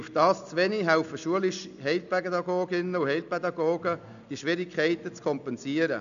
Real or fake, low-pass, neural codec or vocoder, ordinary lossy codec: real; 7.2 kHz; none; none